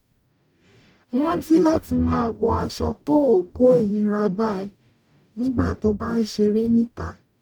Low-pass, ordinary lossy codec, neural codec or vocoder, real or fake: 19.8 kHz; none; codec, 44.1 kHz, 0.9 kbps, DAC; fake